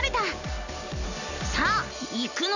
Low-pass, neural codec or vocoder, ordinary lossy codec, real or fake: 7.2 kHz; none; none; real